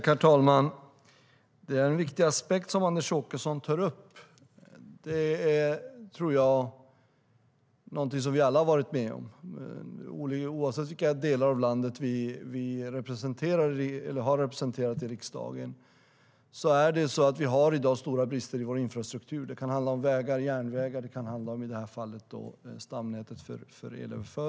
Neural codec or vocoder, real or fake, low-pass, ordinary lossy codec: none; real; none; none